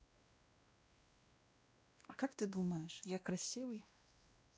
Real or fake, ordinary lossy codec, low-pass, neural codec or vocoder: fake; none; none; codec, 16 kHz, 1 kbps, X-Codec, WavLM features, trained on Multilingual LibriSpeech